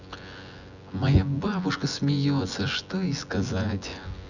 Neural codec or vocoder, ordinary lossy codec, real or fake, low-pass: vocoder, 24 kHz, 100 mel bands, Vocos; none; fake; 7.2 kHz